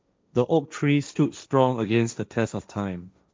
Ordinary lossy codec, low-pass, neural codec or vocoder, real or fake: none; none; codec, 16 kHz, 1.1 kbps, Voila-Tokenizer; fake